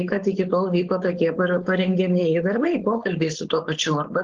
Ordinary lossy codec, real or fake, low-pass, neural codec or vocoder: Opus, 16 kbps; fake; 7.2 kHz; codec, 16 kHz, 4.8 kbps, FACodec